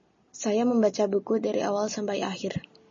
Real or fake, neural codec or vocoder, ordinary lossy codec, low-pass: real; none; MP3, 32 kbps; 7.2 kHz